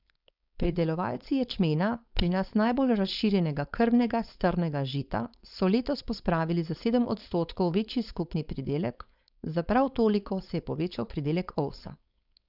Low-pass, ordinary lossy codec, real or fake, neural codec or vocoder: 5.4 kHz; none; fake; codec, 16 kHz, 4.8 kbps, FACodec